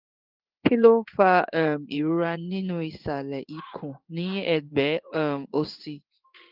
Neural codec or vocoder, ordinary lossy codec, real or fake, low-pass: codec, 16 kHz in and 24 kHz out, 1 kbps, XY-Tokenizer; Opus, 32 kbps; fake; 5.4 kHz